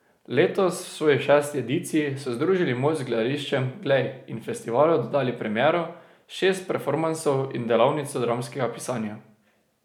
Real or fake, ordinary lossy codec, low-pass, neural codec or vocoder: real; none; 19.8 kHz; none